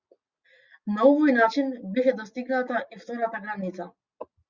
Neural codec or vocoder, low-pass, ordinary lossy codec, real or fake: none; 7.2 kHz; Opus, 64 kbps; real